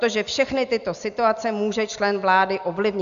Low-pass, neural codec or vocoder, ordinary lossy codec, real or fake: 7.2 kHz; none; AAC, 96 kbps; real